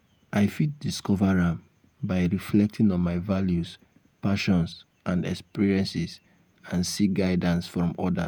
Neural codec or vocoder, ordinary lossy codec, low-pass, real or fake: vocoder, 48 kHz, 128 mel bands, Vocos; none; none; fake